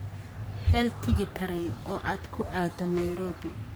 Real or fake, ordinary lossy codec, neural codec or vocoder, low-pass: fake; none; codec, 44.1 kHz, 3.4 kbps, Pupu-Codec; none